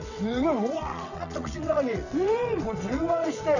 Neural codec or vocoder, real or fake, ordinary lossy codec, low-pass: vocoder, 22.05 kHz, 80 mel bands, WaveNeXt; fake; none; 7.2 kHz